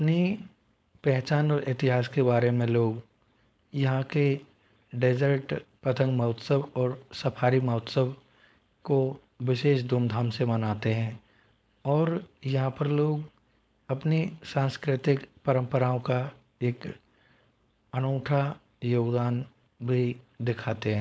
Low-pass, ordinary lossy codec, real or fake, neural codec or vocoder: none; none; fake; codec, 16 kHz, 4.8 kbps, FACodec